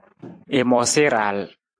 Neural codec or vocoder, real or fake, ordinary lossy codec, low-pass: vocoder, 44.1 kHz, 128 mel bands every 256 samples, BigVGAN v2; fake; AAC, 64 kbps; 9.9 kHz